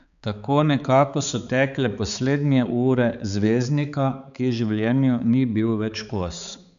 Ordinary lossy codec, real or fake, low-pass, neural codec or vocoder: none; fake; 7.2 kHz; codec, 16 kHz, 4 kbps, X-Codec, HuBERT features, trained on balanced general audio